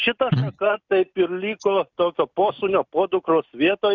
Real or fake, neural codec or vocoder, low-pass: real; none; 7.2 kHz